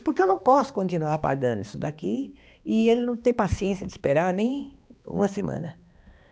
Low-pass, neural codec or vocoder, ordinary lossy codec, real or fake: none; codec, 16 kHz, 2 kbps, X-Codec, HuBERT features, trained on balanced general audio; none; fake